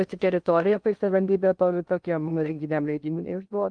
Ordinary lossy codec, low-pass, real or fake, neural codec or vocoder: Opus, 32 kbps; 9.9 kHz; fake; codec, 16 kHz in and 24 kHz out, 0.6 kbps, FocalCodec, streaming, 2048 codes